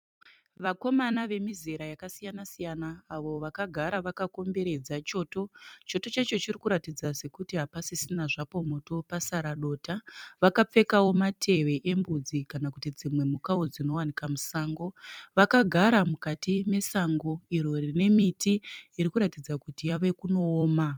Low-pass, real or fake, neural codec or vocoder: 19.8 kHz; fake; vocoder, 44.1 kHz, 128 mel bands every 256 samples, BigVGAN v2